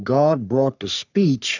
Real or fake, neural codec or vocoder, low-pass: fake; codec, 44.1 kHz, 3.4 kbps, Pupu-Codec; 7.2 kHz